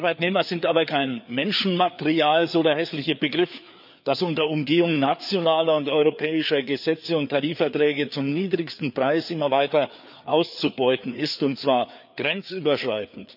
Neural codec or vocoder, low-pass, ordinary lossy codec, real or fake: codec, 16 kHz, 4 kbps, FreqCodec, larger model; 5.4 kHz; none; fake